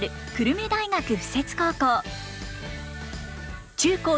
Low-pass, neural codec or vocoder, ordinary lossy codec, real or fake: none; none; none; real